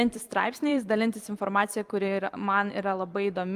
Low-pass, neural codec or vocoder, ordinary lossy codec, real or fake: 14.4 kHz; vocoder, 44.1 kHz, 128 mel bands every 256 samples, BigVGAN v2; Opus, 32 kbps; fake